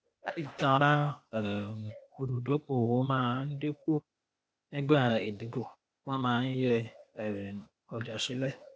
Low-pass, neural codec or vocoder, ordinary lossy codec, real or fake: none; codec, 16 kHz, 0.8 kbps, ZipCodec; none; fake